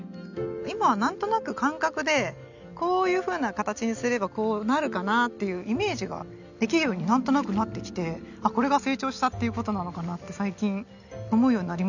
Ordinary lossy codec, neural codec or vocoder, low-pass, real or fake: none; none; 7.2 kHz; real